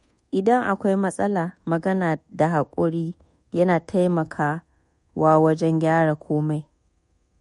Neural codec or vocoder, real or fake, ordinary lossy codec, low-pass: codec, 24 kHz, 1.2 kbps, DualCodec; fake; MP3, 48 kbps; 10.8 kHz